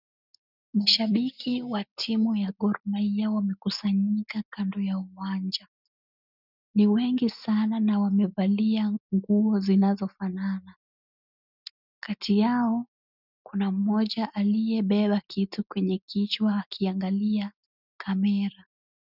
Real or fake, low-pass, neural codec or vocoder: real; 5.4 kHz; none